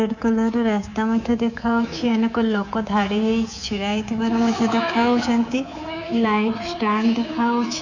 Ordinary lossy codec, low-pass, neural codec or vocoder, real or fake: none; 7.2 kHz; codec, 24 kHz, 3.1 kbps, DualCodec; fake